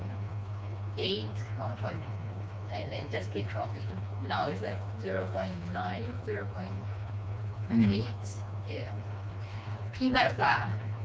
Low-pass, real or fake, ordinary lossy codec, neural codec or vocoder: none; fake; none; codec, 16 kHz, 2 kbps, FreqCodec, smaller model